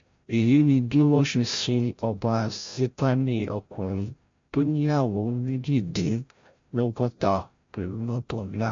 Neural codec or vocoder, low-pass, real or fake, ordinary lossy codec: codec, 16 kHz, 0.5 kbps, FreqCodec, larger model; 7.2 kHz; fake; MP3, 48 kbps